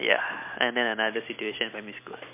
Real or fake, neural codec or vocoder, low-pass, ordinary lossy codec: real; none; 3.6 kHz; MP3, 24 kbps